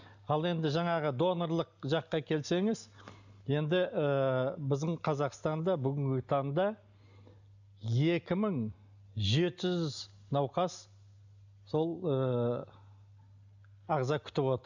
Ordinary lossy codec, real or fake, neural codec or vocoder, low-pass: none; real; none; 7.2 kHz